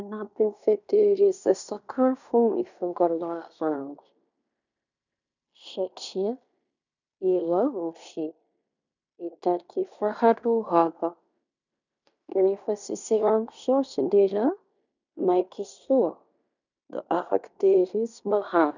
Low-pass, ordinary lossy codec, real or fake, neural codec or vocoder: 7.2 kHz; none; fake; codec, 16 kHz in and 24 kHz out, 0.9 kbps, LongCat-Audio-Codec, fine tuned four codebook decoder